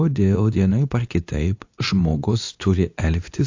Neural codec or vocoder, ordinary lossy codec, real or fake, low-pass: codec, 24 kHz, 0.9 kbps, WavTokenizer, medium speech release version 2; AAC, 48 kbps; fake; 7.2 kHz